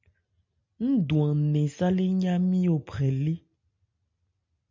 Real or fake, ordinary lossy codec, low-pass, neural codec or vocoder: real; MP3, 64 kbps; 7.2 kHz; none